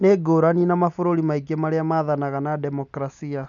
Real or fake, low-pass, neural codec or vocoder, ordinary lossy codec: real; 7.2 kHz; none; none